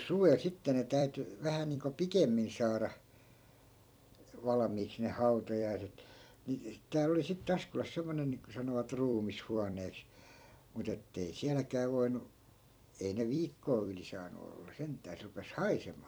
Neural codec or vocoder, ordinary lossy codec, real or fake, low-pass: vocoder, 44.1 kHz, 128 mel bands every 256 samples, BigVGAN v2; none; fake; none